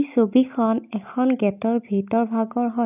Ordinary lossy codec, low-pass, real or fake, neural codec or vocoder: none; 3.6 kHz; real; none